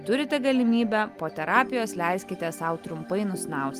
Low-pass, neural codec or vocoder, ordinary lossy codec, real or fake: 14.4 kHz; none; Opus, 32 kbps; real